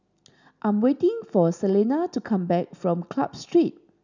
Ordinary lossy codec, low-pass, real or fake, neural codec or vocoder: none; 7.2 kHz; real; none